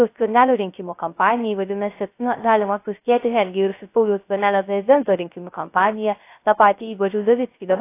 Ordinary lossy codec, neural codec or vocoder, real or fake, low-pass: AAC, 24 kbps; codec, 16 kHz, 0.3 kbps, FocalCodec; fake; 3.6 kHz